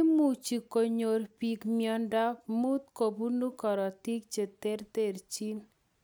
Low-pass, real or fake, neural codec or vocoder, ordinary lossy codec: none; real; none; none